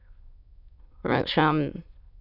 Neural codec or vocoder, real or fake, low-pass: autoencoder, 22.05 kHz, a latent of 192 numbers a frame, VITS, trained on many speakers; fake; 5.4 kHz